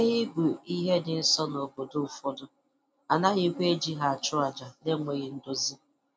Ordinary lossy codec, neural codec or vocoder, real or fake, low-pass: none; none; real; none